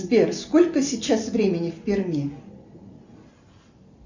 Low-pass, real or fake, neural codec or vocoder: 7.2 kHz; real; none